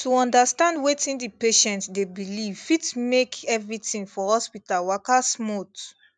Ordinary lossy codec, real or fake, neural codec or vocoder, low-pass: none; real; none; none